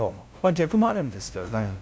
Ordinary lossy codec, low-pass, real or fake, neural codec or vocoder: none; none; fake; codec, 16 kHz, 0.5 kbps, FunCodec, trained on LibriTTS, 25 frames a second